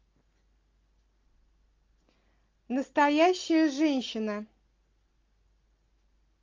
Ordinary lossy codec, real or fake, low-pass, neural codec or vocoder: Opus, 24 kbps; real; 7.2 kHz; none